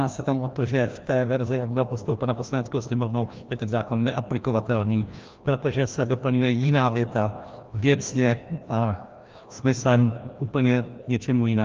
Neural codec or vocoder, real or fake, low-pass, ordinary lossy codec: codec, 16 kHz, 1 kbps, FreqCodec, larger model; fake; 7.2 kHz; Opus, 32 kbps